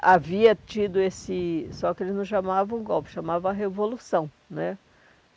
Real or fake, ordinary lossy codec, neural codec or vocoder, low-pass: real; none; none; none